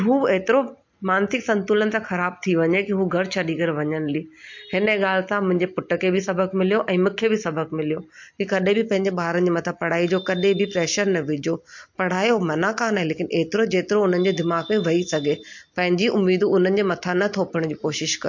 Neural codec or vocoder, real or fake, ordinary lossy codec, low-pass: none; real; MP3, 48 kbps; 7.2 kHz